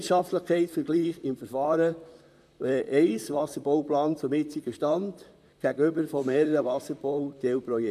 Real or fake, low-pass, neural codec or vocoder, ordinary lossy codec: fake; 14.4 kHz; vocoder, 44.1 kHz, 128 mel bands, Pupu-Vocoder; MP3, 96 kbps